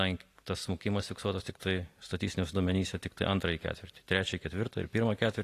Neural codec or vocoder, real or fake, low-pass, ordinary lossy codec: none; real; 14.4 kHz; AAC, 48 kbps